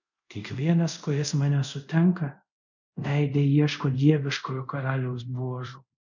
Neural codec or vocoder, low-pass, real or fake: codec, 24 kHz, 0.5 kbps, DualCodec; 7.2 kHz; fake